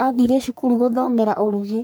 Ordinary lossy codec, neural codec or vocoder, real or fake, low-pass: none; codec, 44.1 kHz, 3.4 kbps, Pupu-Codec; fake; none